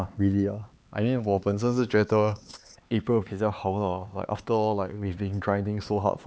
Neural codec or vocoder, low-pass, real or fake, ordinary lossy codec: codec, 16 kHz, 4 kbps, X-Codec, HuBERT features, trained on LibriSpeech; none; fake; none